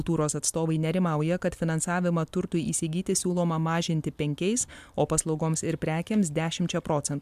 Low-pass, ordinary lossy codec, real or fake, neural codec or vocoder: 14.4 kHz; MP3, 96 kbps; real; none